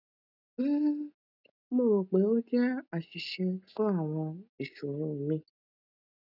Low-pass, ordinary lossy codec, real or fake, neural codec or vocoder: 5.4 kHz; none; real; none